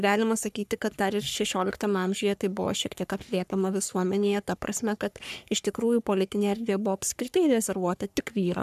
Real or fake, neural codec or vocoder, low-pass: fake; codec, 44.1 kHz, 3.4 kbps, Pupu-Codec; 14.4 kHz